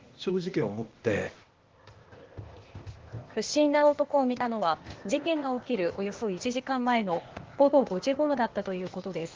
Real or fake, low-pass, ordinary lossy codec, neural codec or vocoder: fake; 7.2 kHz; Opus, 16 kbps; codec, 16 kHz, 0.8 kbps, ZipCodec